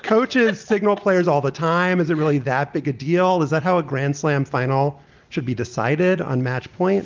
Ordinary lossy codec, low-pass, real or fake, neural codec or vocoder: Opus, 32 kbps; 7.2 kHz; real; none